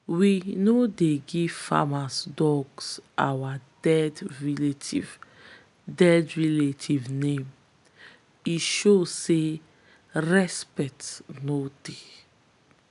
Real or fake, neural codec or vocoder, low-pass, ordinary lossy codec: real; none; 10.8 kHz; none